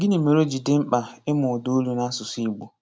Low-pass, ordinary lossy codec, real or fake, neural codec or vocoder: none; none; real; none